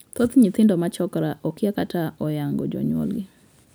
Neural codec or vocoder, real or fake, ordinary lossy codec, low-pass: none; real; none; none